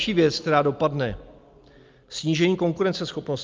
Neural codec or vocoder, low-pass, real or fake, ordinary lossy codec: none; 7.2 kHz; real; Opus, 24 kbps